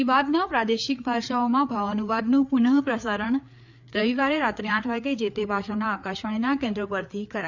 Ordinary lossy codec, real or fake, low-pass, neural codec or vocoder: none; fake; 7.2 kHz; codec, 16 kHz, 4 kbps, FreqCodec, larger model